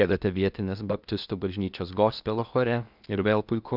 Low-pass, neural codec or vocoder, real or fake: 5.4 kHz; codec, 16 kHz in and 24 kHz out, 0.8 kbps, FocalCodec, streaming, 65536 codes; fake